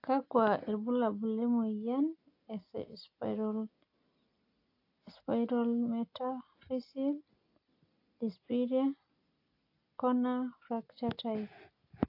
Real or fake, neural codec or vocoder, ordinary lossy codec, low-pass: real; none; AAC, 32 kbps; 5.4 kHz